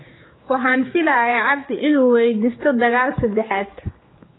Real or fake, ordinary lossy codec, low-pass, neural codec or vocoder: fake; AAC, 16 kbps; 7.2 kHz; codec, 16 kHz, 4 kbps, X-Codec, HuBERT features, trained on general audio